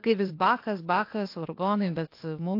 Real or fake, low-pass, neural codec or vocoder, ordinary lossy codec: fake; 5.4 kHz; codec, 16 kHz, 0.8 kbps, ZipCodec; AAC, 32 kbps